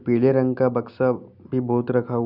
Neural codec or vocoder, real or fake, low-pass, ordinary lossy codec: none; real; 5.4 kHz; none